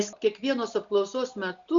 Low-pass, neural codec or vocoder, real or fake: 7.2 kHz; none; real